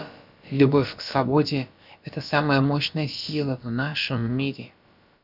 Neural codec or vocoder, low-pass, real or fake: codec, 16 kHz, about 1 kbps, DyCAST, with the encoder's durations; 5.4 kHz; fake